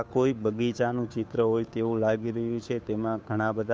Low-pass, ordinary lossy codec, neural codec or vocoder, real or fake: none; none; codec, 16 kHz, 2 kbps, FunCodec, trained on Chinese and English, 25 frames a second; fake